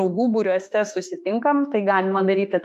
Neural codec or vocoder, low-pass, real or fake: autoencoder, 48 kHz, 32 numbers a frame, DAC-VAE, trained on Japanese speech; 14.4 kHz; fake